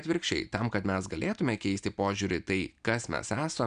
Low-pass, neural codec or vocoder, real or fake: 9.9 kHz; none; real